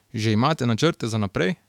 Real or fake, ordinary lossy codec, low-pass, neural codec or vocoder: fake; none; 19.8 kHz; autoencoder, 48 kHz, 128 numbers a frame, DAC-VAE, trained on Japanese speech